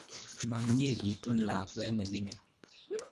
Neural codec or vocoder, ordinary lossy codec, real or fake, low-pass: codec, 24 kHz, 1.5 kbps, HILCodec; none; fake; none